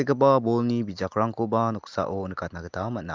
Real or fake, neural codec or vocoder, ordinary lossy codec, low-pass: real; none; Opus, 24 kbps; 7.2 kHz